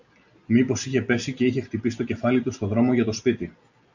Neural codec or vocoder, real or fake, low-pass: none; real; 7.2 kHz